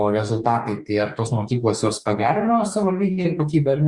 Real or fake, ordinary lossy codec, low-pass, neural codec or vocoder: fake; Opus, 64 kbps; 10.8 kHz; codec, 44.1 kHz, 2.6 kbps, DAC